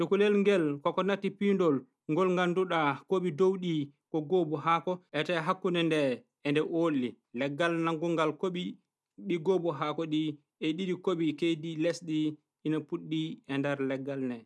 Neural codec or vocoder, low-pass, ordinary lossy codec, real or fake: none; none; none; real